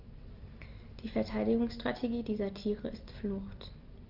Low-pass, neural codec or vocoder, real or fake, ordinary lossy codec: 5.4 kHz; none; real; Opus, 32 kbps